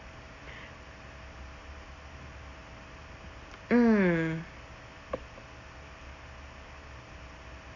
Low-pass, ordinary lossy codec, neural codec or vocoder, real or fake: 7.2 kHz; none; none; real